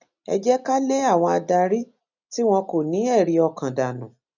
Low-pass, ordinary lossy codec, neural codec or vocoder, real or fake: 7.2 kHz; none; none; real